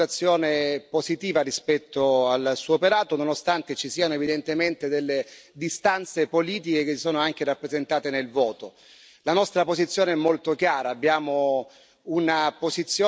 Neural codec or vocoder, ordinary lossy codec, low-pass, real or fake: none; none; none; real